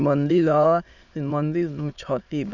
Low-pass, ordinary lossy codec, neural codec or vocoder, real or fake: 7.2 kHz; none; autoencoder, 22.05 kHz, a latent of 192 numbers a frame, VITS, trained on many speakers; fake